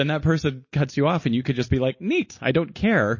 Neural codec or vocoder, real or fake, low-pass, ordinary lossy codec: none; real; 7.2 kHz; MP3, 32 kbps